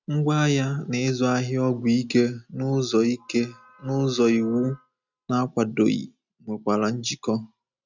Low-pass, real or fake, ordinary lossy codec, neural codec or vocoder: 7.2 kHz; real; none; none